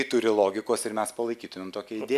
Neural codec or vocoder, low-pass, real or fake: none; 14.4 kHz; real